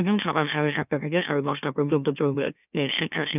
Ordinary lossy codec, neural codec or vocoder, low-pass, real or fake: none; autoencoder, 44.1 kHz, a latent of 192 numbers a frame, MeloTTS; 3.6 kHz; fake